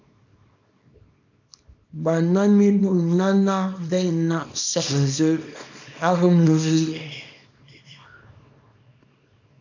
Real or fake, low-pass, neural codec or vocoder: fake; 7.2 kHz; codec, 24 kHz, 0.9 kbps, WavTokenizer, small release